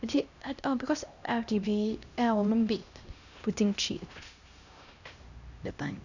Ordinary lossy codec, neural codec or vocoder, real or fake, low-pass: none; codec, 16 kHz, 1 kbps, X-Codec, HuBERT features, trained on LibriSpeech; fake; 7.2 kHz